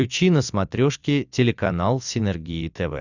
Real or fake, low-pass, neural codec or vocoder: fake; 7.2 kHz; vocoder, 44.1 kHz, 128 mel bands every 256 samples, BigVGAN v2